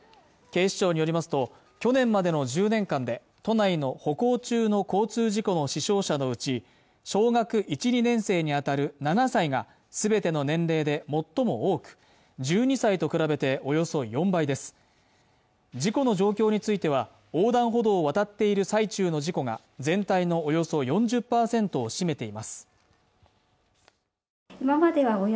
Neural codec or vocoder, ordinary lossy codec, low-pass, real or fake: none; none; none; real